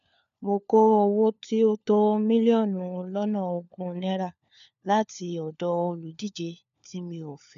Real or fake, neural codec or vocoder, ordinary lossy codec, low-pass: fake; codec, 16 kHz, 4 kbps, FunCodec, trained on LibriTTS, 50 frames a second; none; 7.2 kHz